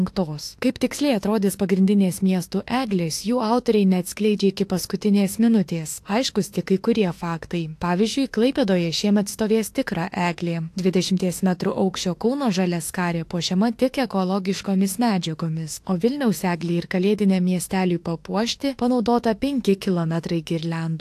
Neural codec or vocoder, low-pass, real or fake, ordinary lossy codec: autoencoder, 48 kHz, 32 numbers a frame, DAC-VAE, trained on Japanese speech; 14.4 kHz; fake; AAC, 64 kbps